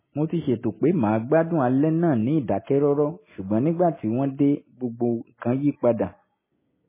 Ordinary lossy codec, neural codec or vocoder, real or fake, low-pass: MP3, 16 kbps; none; real; 3.6 kHz